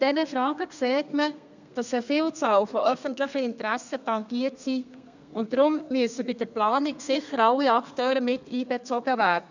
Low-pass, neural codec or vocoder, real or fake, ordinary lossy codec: 7.2 kHz; codec, 32 kHz, 1.9 kbps, SNAC; fake; none